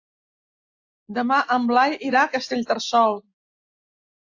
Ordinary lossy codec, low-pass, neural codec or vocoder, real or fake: AAC, 48 kbps; 7.2 kHz; none; real